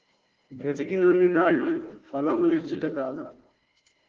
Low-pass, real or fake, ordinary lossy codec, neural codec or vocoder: 7.2 kHz; fake; Opus, 16 kbps; codec, 16 kHz, 1 kbps, FunCodec, trained on Chinese and English, 50 frames a second